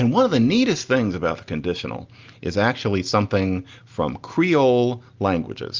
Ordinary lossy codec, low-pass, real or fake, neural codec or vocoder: Opus, 32 kbps; 7.2 kHz; real; none